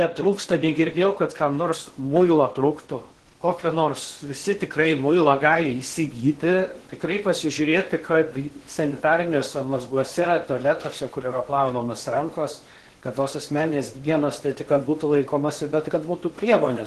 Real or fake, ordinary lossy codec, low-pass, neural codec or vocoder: fake; Opus, 16 kbps; 10.8 kHz; codec, 16 kHz in and 24 kHz out, 0.8 kbps, FocalCodec, streaming, 65536 codes